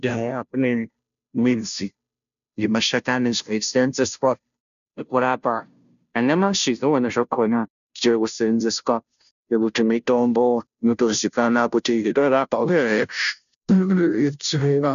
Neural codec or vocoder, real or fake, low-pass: codec, 16 kHz, 0.5 kbps, FunCodec, trained on Chinese and English, 25 frames a second; fake; 7.2 kHz